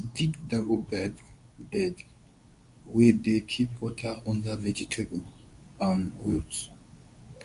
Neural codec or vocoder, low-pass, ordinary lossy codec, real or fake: codec, 24 kHz, 0.9 kbps, WavTokenizer, medium speech release version 2; 10.8 kHz; none; fake